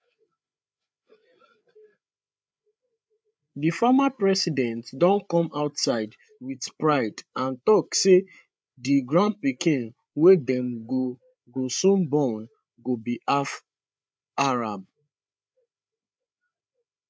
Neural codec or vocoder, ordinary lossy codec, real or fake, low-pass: codec, 16 kHz, 8 kbps, FreqCodec, larger model; none; fake; none